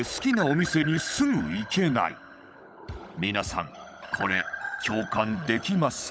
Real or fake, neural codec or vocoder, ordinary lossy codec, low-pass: fake; codec, 16 kHz, 16 kbps, FunCodec, trained on LibriTTS, 50 frames a second; none; none